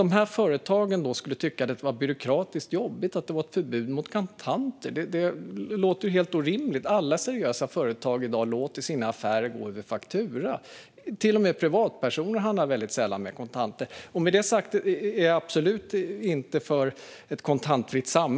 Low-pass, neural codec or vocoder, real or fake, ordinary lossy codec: none; none; real; none